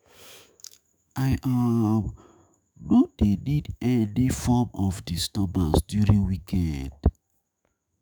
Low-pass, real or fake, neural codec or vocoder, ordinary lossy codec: none; fake; autoencoder, 48 kHz, 128 numbers a frame, DAC-VAE, trained on Japanese speech; none